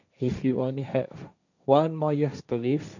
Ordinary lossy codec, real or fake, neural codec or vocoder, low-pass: none; fake; codec, 16 kHz, 1.1 kbps, Voila-Tokenizer; 7.2 kHz